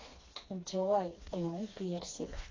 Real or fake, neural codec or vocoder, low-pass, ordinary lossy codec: fake; codec, 16 kHz, 2 kbps, FreqCodec, smaller model; 7.2 kHz; AAC, 32 kbps